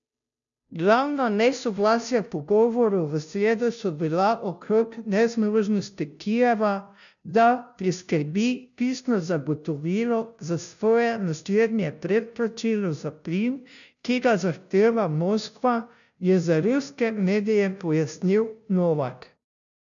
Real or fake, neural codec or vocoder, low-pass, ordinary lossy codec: fake; codec, 16 kHz, 0.5 kbps, FunCodec, trained on Chinese and English, 25 frames a second; 7.2 kHz; none